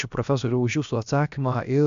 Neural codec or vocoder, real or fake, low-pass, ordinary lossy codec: codec, 16 kHz, about 1 kbps, DyCAST, with the encoder's durations; fake; 7.2 kHz; Opus, 64 kbps